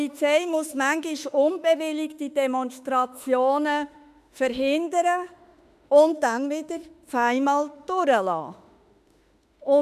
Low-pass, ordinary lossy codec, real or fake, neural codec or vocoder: 14.4 kHz; none; fake; autoencoder, 48 kHz, 32 numbers a frame, DAC-VAE, trained on Japanese speech